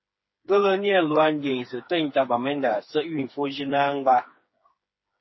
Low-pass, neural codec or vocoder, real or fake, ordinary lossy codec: 7.2 kHz; codec, 16 kHz, 4 kbps, FreqCodec, smaller model; fake; MP3, 24 kbps